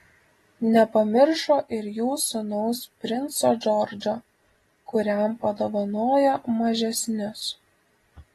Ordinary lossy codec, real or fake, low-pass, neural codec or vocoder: AAC, 32 kbps; real; 19.8 kHz; none